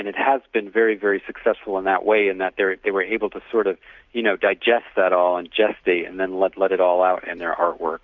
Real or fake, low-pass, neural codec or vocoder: real; 7.2 kHz; none